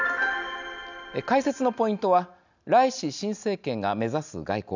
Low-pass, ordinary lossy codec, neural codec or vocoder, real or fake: 7.2 kHz; none; none; real